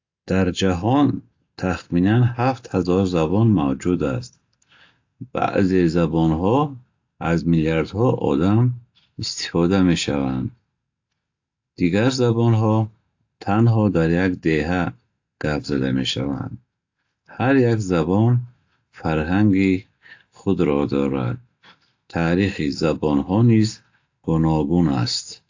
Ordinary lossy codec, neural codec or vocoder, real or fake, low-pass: AAC, 48 kbps; none; real; 7.2 kHz